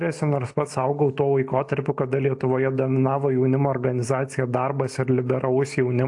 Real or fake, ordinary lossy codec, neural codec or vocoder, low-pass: real; AAC, 64 kbps; none; 10.8 kHz